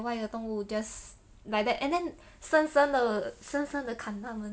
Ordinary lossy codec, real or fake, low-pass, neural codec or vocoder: none; real; none; none